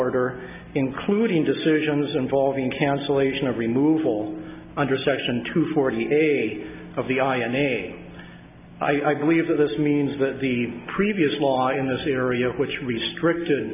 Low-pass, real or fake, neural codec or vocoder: 3.6 kHz; real; none